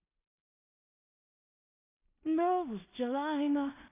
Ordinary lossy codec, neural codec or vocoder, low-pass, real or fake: none; codec, 16 kHz in and 24 kHz out, 0.4 kbps, LongCat-Audio-Codec, two codebook decoder; 3.6 kHz; fake